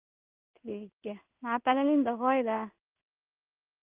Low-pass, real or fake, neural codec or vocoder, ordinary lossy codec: 3.6 kHz; fake; codec, 16 kHz in and 24 kHz out, 1 kbps, XY-Tokenizer; Opus, 32 kbps